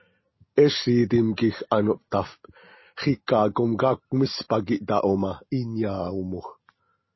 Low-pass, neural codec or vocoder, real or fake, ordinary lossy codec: 7.2 kHz; none; real; MP3, 24 kbps